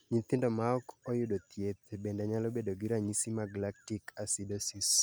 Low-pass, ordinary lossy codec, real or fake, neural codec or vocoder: none; none; real; none